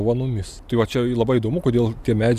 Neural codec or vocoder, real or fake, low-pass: none; real; 14.4 kHz